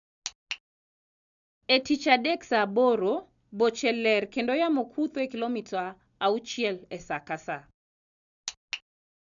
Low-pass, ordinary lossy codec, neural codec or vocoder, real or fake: 7.2 kHz; none; none; real